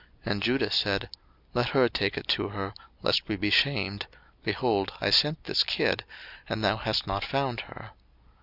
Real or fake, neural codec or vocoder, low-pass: real; none; 5.4 kHz